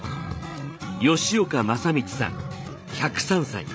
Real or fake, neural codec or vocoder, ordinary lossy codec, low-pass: fake; codec, 16 kHz, 8 kbps, FreqCodec, larger model; none; none